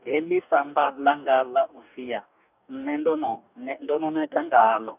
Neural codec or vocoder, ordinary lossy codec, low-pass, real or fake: codec, 44.1 kHz, 2.6 kbps, DAC; none; 3.6 kHz; fake